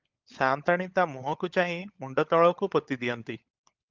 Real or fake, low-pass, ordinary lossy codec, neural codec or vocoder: fake; 7.2 kHz; Opus, 24 kbps; codec, 16 kHz, 16 kbps, FunCodec, trained on LibriTTS, 50 frames a second